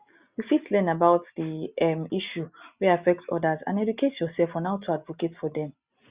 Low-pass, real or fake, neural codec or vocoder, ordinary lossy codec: 3.6 kHz; real; none; Opus, 64 kbps